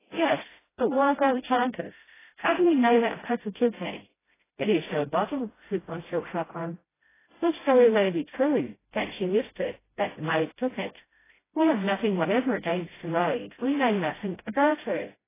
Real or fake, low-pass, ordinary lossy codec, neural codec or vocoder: fake; 3.6 kHz; AAC, 16 kbps; codec, 16 kHz, 0.5 kbps, FreqCodec, smaller model